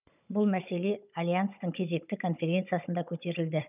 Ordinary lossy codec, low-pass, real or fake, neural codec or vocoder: none; 3.6 kHz; fake; codec, 16 kHz, 16 kbps, FunCodec, trained on Chinese and English, 50 frames a second